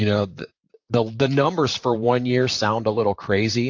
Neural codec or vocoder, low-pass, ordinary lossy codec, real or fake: vocoder, 44.1 kHz, 128 mel bands, Pupu-Vocoder; 7.2 kHz; AAC, 48 kbps; fake